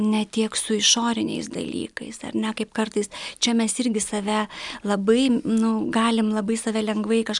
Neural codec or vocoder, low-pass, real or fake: none; 10.8 kHz; real